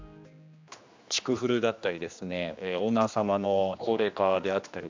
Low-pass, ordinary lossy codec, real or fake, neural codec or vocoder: 7.2 kHz; MP3, 64 kbps; fake; codec, 16 kHz, 2 kbps, X-Codec, HuBERT features, trained on general audio